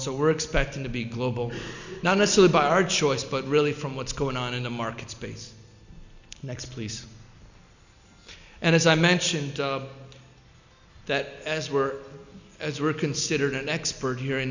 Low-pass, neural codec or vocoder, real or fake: 7.2 kHz; none; real